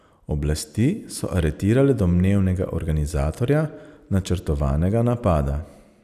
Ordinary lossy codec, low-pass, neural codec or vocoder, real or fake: none; 14.4 kHz; none; real